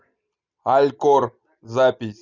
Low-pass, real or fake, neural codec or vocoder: 7.2 kHz; real; none